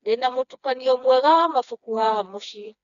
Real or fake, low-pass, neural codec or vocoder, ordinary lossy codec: fake; 7.2 kHz; codec, 16 kHz, 2 kbps, FreqCodec, smaller model; none